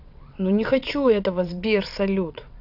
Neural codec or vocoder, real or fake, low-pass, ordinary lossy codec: vocoder, 22.05 kHz, 80 mel bands, WaveNeXt; fake; 5.4 kHz; none